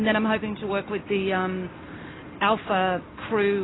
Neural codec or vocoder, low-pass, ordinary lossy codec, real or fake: none; 7.2 kHz; AAC, 16 kbps; real